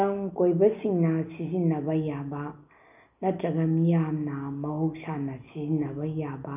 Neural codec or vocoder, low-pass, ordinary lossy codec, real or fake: none; 3.6 kHz; none; real